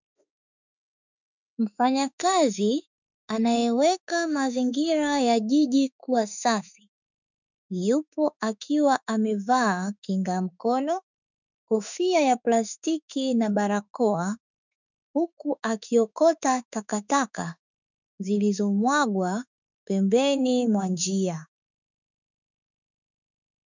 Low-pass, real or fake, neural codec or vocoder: 7.2 kHz; fake; autoencoder, 48 kHz, 32 numbers a frame, DAC-VAE, trained on Japanese speech